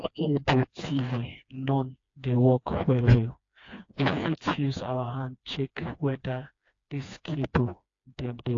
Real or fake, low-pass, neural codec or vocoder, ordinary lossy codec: fake; 7.2 kHz; codec, 16 kHz, 2 kbps, FreqCodec, smaller model; AAC, 48 kbps